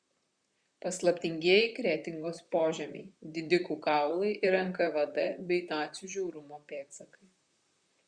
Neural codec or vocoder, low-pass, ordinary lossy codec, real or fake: vocoder, 44.1 kHz, 128 mel bands, Pupu-Vocoder; 9.9 kHz; Opus, 64 kbps; fake